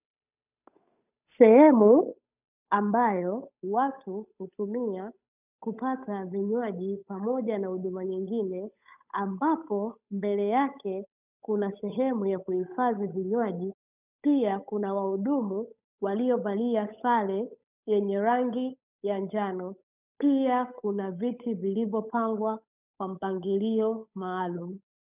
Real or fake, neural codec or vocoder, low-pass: fake; codec, 16 kHz, 8 kbps, FunCodec, trained on Chinese and English, 25 frames a second; 3.6 kHz